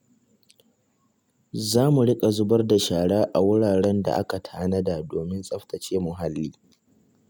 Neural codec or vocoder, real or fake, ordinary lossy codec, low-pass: none; real; none; 19.8 kHz